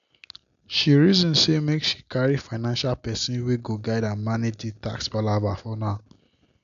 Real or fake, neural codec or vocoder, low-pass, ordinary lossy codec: real; none; 7.2 kHz; none